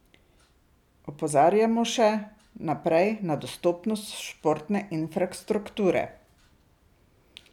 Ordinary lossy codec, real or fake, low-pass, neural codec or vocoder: none; real; 19.8 kHz; none